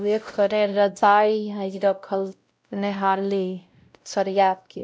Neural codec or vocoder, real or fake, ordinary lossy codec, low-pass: codec, 16 kHz, 0.5 kbps, X-Codec, WavLM features, trained on Multilingual LibriSpeech; fake; none; none